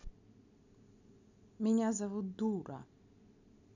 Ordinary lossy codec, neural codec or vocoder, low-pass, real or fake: none; none; 7.2 kHz; real